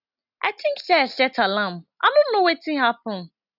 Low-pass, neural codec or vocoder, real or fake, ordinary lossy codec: 5.4 kHz; none; real; none